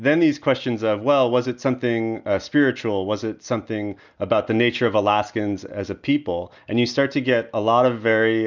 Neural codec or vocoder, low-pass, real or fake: none; 7.2 kHz; real